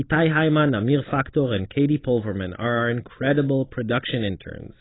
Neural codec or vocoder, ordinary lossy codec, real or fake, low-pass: none; AAC, 16 kbps; real; 7.2 kHz